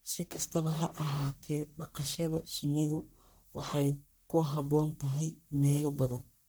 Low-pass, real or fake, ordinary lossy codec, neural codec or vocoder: none; fake; none; codec, 44.1 kHz, 1.7 kbps, Pupu-Codec